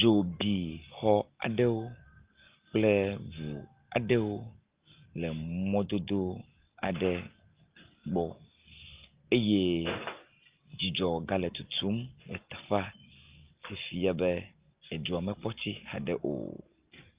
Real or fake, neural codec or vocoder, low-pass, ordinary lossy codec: real; none; 3.6 kHz; Opus, 32 kbps